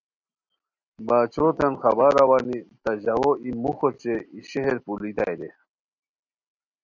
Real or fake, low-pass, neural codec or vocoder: real; 7.2 kHz; none